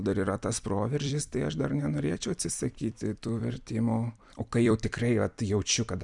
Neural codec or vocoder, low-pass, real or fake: vocoder, 44.1 kHz, 128 mel bands every 256 samples, BigVGAN v2; 10.8 kHz; fake